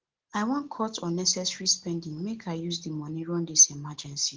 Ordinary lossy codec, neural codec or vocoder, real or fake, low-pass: Opus, 16 kbps; none; real; 7.2 kHz